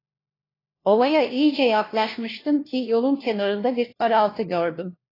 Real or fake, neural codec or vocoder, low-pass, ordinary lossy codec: fake; codec, 16 kHz, 1 kbps, FunCodec, trained on LibriTTS, 50 frames a second; 5.4 kHz; AAC, 24 kbps